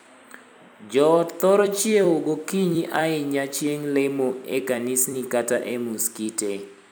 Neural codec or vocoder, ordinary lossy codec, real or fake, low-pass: vocoder, 44.1 kHz, 128 mel bands every 256 samples, BigVGAN v2; none; fake; none